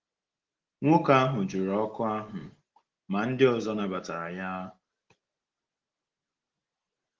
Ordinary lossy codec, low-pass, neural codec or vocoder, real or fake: Opus, 16 kbps; 7.2 kHz; none; real